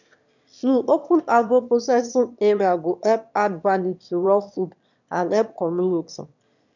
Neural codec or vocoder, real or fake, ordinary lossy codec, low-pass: autoencoder, 22.05 kHz, a latent of 192 numbers a frame, VITS, trained on one speaker; fake; none; 7.2 kHz